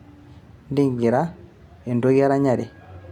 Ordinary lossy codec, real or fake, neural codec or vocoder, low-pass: none; real; none; 19.8 kHz